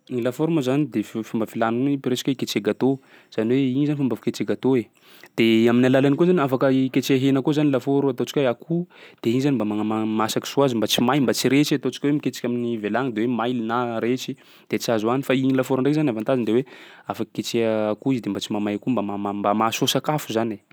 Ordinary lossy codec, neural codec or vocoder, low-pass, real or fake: none; none; none; real